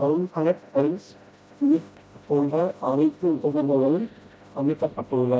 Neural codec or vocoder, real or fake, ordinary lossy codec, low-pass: codec, 16 kHz, 0.5 kbps, FreqCodec, smaller model; fake; none; none